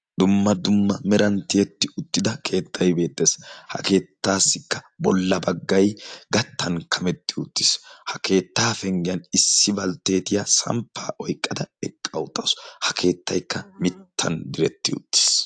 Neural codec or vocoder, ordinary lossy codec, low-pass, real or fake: none; AAC, 64 kbps; 9.9 kHz; real